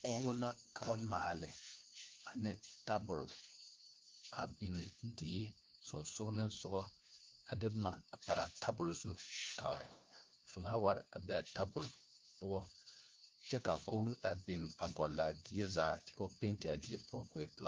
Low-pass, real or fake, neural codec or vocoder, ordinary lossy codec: 7.2 kHz; fake; codec, 16 kHz, 1 kbps, FunCodec, trained on LibriTTS, 50 frames a second; Opus, 32 kbps